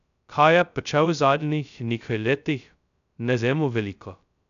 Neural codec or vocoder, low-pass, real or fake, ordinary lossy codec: codec, 16 kHz, 0.2 kbps, FocalCodec; 7.2 kHz; fake; none